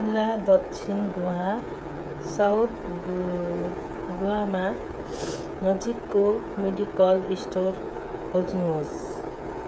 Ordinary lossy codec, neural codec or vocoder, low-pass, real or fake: none; codec, 16 kHz, 8 kbps, FreqCodec, smaller model; none; fake